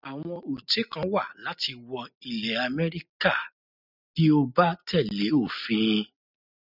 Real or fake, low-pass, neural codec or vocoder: real; 5.4 kHz; none